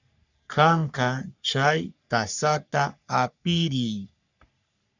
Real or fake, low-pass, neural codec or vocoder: fake; 7.2 kHz; codec, 44.1 kHz, 3.4 kbps, Pupu-Codec